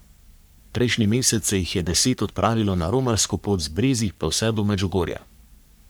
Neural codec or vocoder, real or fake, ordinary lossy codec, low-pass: codec, 44.1 kHz, 3.4 kbps, Pupu-Codec; fake; none; none